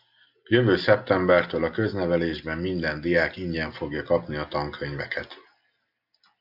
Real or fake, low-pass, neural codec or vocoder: real; 5.4 kHz; none